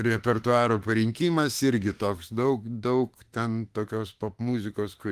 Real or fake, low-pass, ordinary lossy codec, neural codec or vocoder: fake; 14.4 kHz; Opus, 24 kbps; autoencoder, 48 kHz, 32 numbers a frame, DAC-VAE, trained on Japanese speech